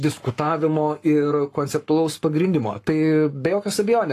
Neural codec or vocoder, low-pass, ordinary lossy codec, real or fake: codec, 44.1 kHz, 7.8 kbps, Pupu-Codec; 14.4 kHz; AAC, 48 kbps; fake